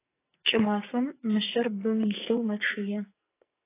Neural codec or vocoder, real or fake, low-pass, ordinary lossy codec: codec, 44.1 kHz, 2.6 kbps, SNAC; fake; 3.6 kHz; AAC, 24 kbps